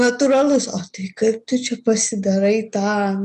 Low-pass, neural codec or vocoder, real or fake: 10.8 kHz; none; real